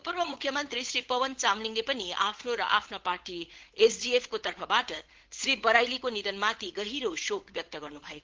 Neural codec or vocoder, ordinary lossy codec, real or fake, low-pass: codec, 16 kHz, 8 kbps, FunCodec, trained on Chinese and English, 25 frames a second; Opus, 16 kbps; fake; 7.2 kHz